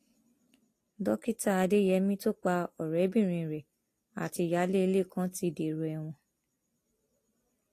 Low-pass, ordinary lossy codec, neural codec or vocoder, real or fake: 14.4 kHz; AAC, 48 kbps; none; real